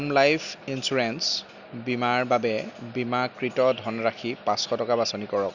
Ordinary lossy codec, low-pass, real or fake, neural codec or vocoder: none; 7.2 kHz; real; none